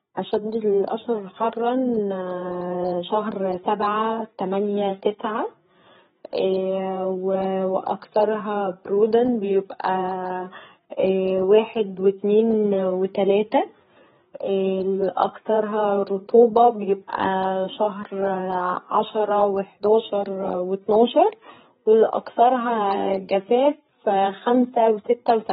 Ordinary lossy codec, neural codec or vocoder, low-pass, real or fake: AAC, 16 kbps; codec, 16 kHz, 4 kbps, FreqCodec, larger model; 7.2 kHz; fake